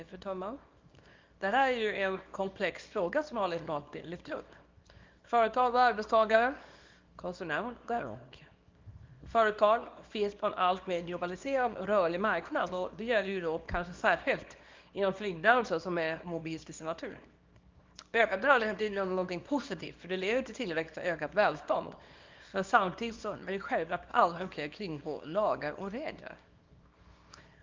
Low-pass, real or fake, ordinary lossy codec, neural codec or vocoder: 7.2 kHz; fake; Opus, 24 kbps; codec, 24 kHz, 0.9 kbps, WavTokenizer, small release